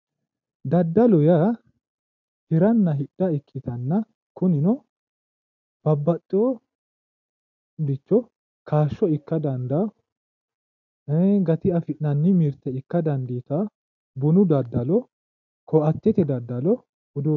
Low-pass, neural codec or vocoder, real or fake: 7.2 kHz; none; real